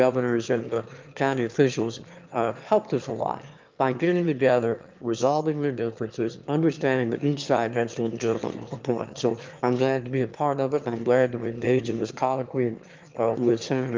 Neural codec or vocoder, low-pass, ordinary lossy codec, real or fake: autoencoder, 22.05 kHz, a latent of 192 numbers a frame, VITS, trained on one speaker; 7.2 kHz; Opus, 24 kbps; fake